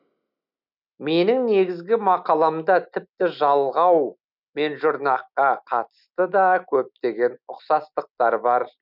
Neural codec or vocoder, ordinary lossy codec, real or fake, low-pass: none; none; real; 5.4 kHz